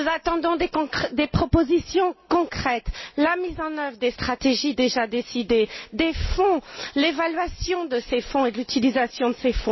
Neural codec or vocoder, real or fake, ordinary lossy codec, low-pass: none; real; MP3, 24 kbps; 7.2 kHz